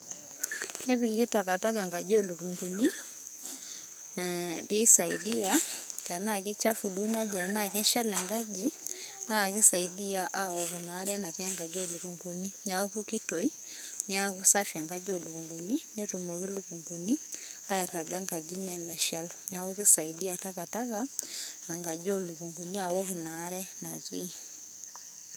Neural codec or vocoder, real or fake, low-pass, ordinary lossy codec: codec, 44.1 kHz, 2.6 kbps, SNAC; fake; none; none